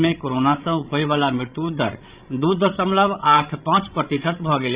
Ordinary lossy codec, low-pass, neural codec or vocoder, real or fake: Opus, 64 kbps; 3.6 kHz; codec, 16 kHz, 16 kbps, FreqCodec, larger model; fake